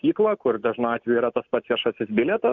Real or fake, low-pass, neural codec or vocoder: real; 7.2 kHz; none